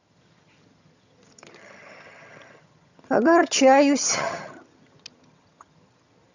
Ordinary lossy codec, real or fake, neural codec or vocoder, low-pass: none; fake; vocoder, 22.05 kHz, 80 mel bands, HiFi-GAN; 7.2 kHz